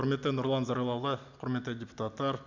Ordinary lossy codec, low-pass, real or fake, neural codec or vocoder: none; 7.2 kHz; real; none